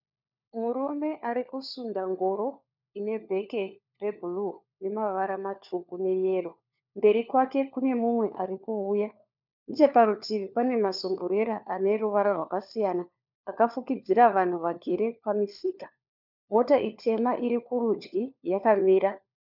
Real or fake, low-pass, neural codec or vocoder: fake; 5.4 kHz; codec, 16 kHz, 4 kbps, FunCodec, trained on LibriTTS, 50 frames a second